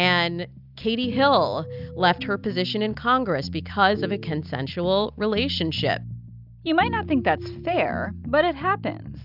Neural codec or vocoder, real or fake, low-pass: none; real; 5.4 kHz